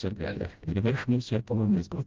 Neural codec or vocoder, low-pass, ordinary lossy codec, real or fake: codec, 16 kHz, 0.5 kbps, FreqCodec, smaller model; 7.2 kHz; Opus, 32 kbps; fake